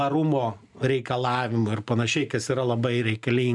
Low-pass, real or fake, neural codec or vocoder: 10.8 kHz; real; none